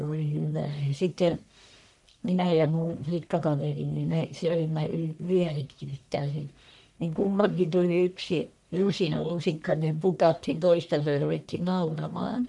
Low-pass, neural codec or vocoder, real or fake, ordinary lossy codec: 10.8 kHz; codec, 44.1 kHz, 1.7 kbps, Pupu-Codec; fake; none